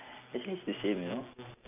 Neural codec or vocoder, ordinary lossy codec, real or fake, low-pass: none; none; real; 3.6 kHz